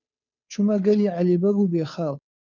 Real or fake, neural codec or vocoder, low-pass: fake; codec, 16 kHz, 2 kbps, FunCodec, trained on Chinese and English, 25 frames a second; 7.2 kHz